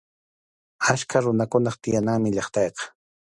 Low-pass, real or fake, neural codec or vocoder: 10.8 kHz; real; none